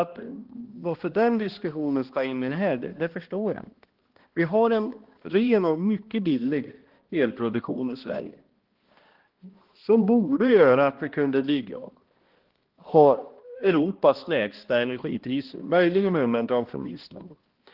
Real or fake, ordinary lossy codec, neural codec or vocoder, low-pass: fake; Opus, 16 kbps; codec, 16 kHz, 1 kbps, X-Codec, HuBERT features, trained on balanced general audio; 5.4 kHz